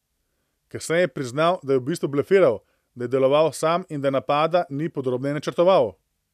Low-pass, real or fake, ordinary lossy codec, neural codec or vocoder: 14.4 kHz; real; none; none